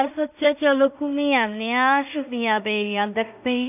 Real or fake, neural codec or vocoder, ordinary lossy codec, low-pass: fake; codec, 16 kHz in and 24 kHz out, 0.4 kbps, LongCat-Audio-Codec, two codebook decoder; none; 3.6 kHz